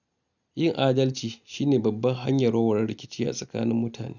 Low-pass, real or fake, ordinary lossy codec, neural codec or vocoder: 7.2 kHz; real; none; none